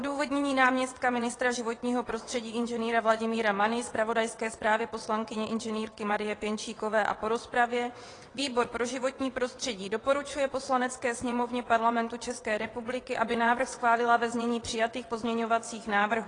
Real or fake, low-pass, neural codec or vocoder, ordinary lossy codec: fake; 9.9 kHz; vocoder, 22.05 kHz, 80 mel bands, WaveNeXt; AAC, 32 kbps